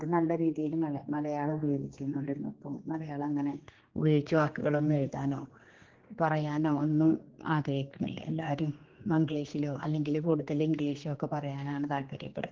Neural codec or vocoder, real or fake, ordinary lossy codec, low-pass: codec, 16 kHz, 2 kbps, X-Codec, HuBERT features, trained on general audio; fake; Opus, 16 kbps; 7.2 kHz